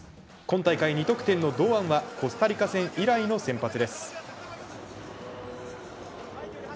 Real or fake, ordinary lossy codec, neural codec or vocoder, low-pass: real; none; none; none